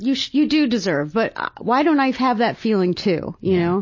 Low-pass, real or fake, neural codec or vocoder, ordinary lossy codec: 7.2 kHz; real; none; MP3, 32 kbps